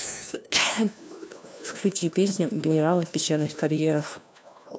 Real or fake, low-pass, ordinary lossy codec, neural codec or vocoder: fake; none; none; codec, 16 kHz, 1 kbps, FunCodec, trained on LibriTTS, 50 frames a second